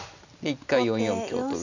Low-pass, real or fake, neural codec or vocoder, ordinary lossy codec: 7.2 kHz; real; none; none